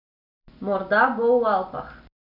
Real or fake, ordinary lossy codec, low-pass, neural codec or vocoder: real; none; 5.4 kHz; none